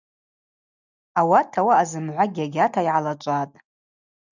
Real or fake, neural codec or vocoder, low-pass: real; none; 7.2 kHz